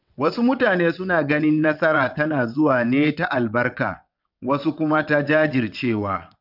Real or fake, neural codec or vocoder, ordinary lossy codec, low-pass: fake; vocoder, 22.05 kHz, 80 mel bands, WaveNeXt; none; 5.4 kHz